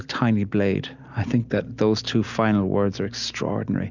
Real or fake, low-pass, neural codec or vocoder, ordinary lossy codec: real; 7.2 kHz; none; Opus, 64 kbps